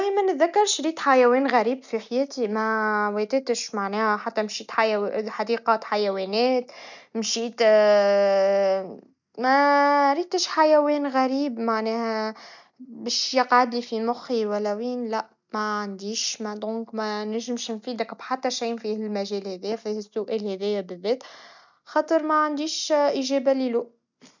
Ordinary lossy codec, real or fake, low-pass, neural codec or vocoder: none; real; 7.2 kHz; none